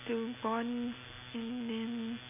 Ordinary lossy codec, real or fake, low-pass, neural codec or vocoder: none; real; 3.6 kHz; none